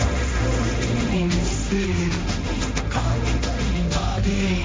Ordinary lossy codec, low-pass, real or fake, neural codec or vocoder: none; none; fake; codec, 16 kHz, 1.1 kbps, Voila-Tokenizer